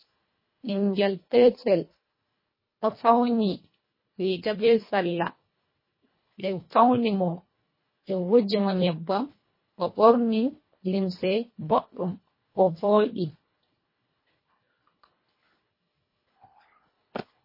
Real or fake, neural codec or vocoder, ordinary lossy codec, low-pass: fake; codec, 24 kHz, 1.5 kbps, HILCodec; MP3, 24 kbps; 5.4 kHz